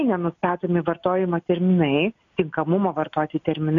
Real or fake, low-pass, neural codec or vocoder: real; 7.2 kHz; none